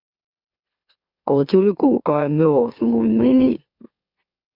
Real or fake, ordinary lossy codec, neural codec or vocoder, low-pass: fake; Opus, 64 kbps; autoencoder, 44.1 kHz, a latent of 192 numbers a frame, MeloTTS; 5.4 kHz